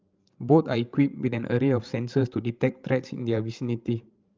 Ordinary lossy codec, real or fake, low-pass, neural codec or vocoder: Opus, 24 kbps; fake; 7.2 kHz; codec, 16 kHz, 16 kbps, FreqCodec, larger model